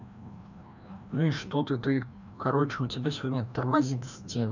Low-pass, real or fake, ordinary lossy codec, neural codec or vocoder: 7.2 kHz; fake; none; codec, 16 kHz, 1 kbps, FreqCodec, larger model